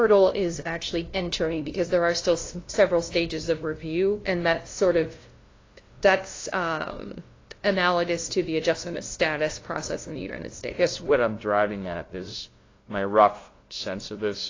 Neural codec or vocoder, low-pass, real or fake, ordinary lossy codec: codec, 16 kHz, 0.5 kbps, FunCodec, trained on LibriTTS, 25 frames a second; 7.2 kHz; fake; AAC, 32 kbps